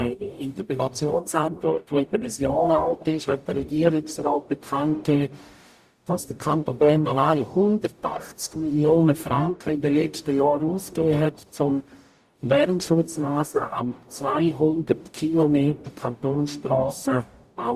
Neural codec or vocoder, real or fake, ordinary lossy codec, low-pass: codec, 44.1 kHz, 0.9 kbps, DAC; fake; Opus, 64 kbps; 14.4 kHz